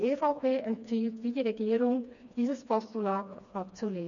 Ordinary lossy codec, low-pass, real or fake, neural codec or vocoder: none; 7.2 kHz; fake; codec, 16 kHz, 2 kbps, FreqCodec, smaller model